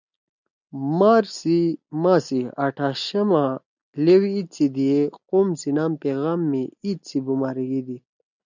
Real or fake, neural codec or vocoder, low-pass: real; none; 7.2 kHz